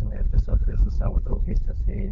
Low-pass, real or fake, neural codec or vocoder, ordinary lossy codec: 7.2 kHz; fake; codec, 16 kHz, 4.8 kbps, FACodec; MP3, 48 kbps